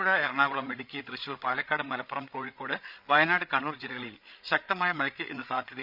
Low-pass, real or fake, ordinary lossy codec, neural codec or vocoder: 5.4 kHz; fake; none; codec, 16 kHz, 16 kbps, FreqCodec, larger model